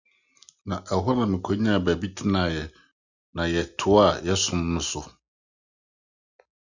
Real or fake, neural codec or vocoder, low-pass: real; none; 7.2 kHz